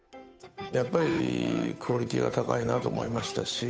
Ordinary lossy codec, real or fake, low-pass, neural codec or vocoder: none; fake; none; codec, 16 kHz, 8 kbps, FunCodec, trained on Chinese and English, 25 frames a second